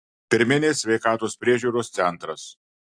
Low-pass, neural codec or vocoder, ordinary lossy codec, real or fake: 9.9 kHz; none; AAC, 64 kbps; real